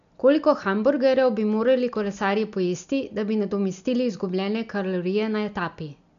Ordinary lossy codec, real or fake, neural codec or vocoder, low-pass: none; real; none; 7.2 kHz